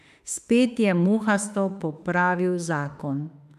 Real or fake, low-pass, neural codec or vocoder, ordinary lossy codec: fake; 14.4 kHz; autoencoder, 48 kHz, 32 numbers a frame, DAC-VAE, trained on Japanese speech; none